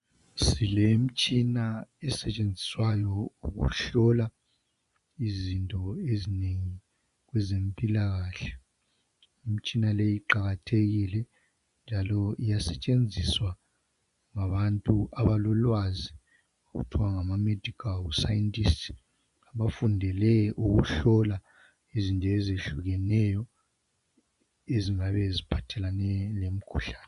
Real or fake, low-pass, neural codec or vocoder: fake; 10.8 kHz; vocoder, 24 kHz, 100 mel bands, Vocos